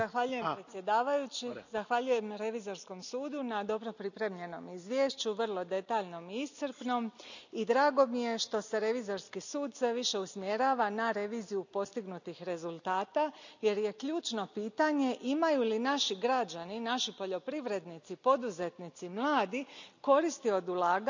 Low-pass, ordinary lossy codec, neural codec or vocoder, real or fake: 7.2 kHz; none; none; real